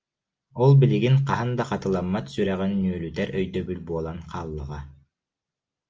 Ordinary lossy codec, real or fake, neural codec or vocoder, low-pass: Opus, 24 kbps; real; none; 7.2 kHz